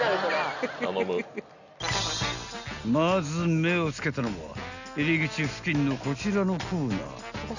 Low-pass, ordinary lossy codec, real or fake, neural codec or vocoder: 7.2 kHz; none; real; none